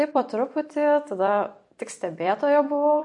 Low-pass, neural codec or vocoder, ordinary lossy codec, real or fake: 10.8 kHz; vocoder, 44.1 kHz, 128 mel bands every 256 samples, BigVGAN v2; MP3, 48 kbps; fake